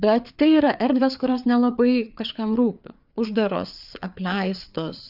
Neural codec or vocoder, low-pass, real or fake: codec, 16 kHz, 4 kbps, FreqCodec, larger model; 5.4 kHz; fake